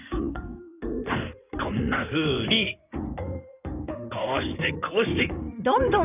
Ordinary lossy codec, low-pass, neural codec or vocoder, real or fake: none; 3.6 kHz; codec, 16 kHz in and 24 kHz out, 2.2 kbps, FireRedTTS-2 codec; fake